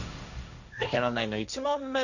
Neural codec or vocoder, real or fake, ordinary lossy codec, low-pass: codec, 16 kHz, 1.1 kbps, Voila-Tokenizer; fake; none; 7.2 kHz